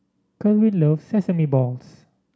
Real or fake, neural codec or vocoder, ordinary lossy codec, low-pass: real; none; none; none